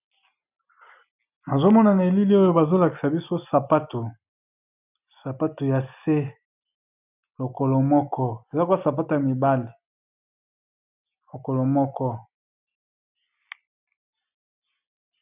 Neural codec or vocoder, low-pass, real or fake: none; 3.6 kHz; real